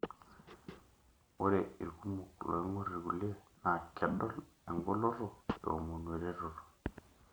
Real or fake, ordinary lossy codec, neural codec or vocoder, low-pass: real; none; none; none